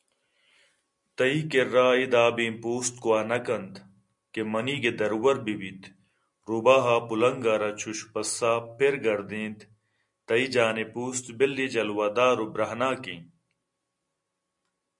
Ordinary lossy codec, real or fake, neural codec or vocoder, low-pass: MP3, 64 kbps; real; none; 10.8 kHz